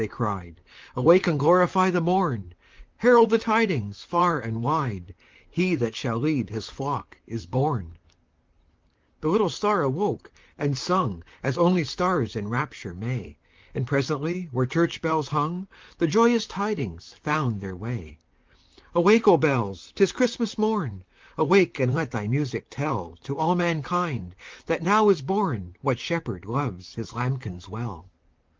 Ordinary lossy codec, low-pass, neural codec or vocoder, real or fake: Opus, 32 kbps; 7.2 kHz; vocoder, 44.1 kHz, 128 mel bands, Pupu-Vocoder; fake